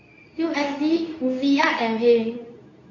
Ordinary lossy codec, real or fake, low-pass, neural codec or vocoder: none; fake; 7.2 kHz; codec, 24 kHz, 0.9 kbps, WavTokenizer, medium speech release version 2